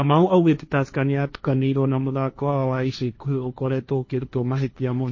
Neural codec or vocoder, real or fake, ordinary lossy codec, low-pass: codec, 16 kHz, 1.1 kbps, Voila-Tokenizer; fake; MP3, 32 kbps; 7.2 kHz